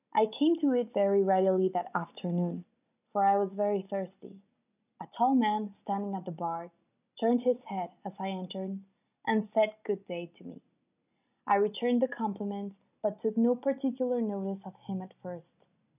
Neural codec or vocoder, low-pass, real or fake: none; 3.6 kHz; real